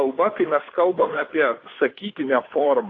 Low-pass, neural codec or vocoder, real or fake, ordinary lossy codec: 7.2 kHz; codec, 16 kHz, 2 kbps, FunCodec, trained on Chinese and English, 25 frames a second; fake; AAC, 32 kbps